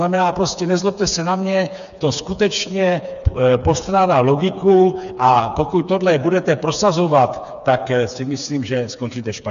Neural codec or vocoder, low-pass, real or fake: codec, 16 kHz, 4 kbps, FreqCodec, smaller model; 7.2 kHz; fake